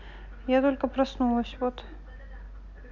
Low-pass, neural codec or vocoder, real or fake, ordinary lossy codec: 7.2 kHz; none; real; none